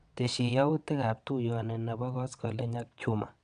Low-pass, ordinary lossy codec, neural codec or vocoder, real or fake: 9.9 kHz; none; vocoder, 22.05 kHz, 80 mel bands, WaveNeXt; fake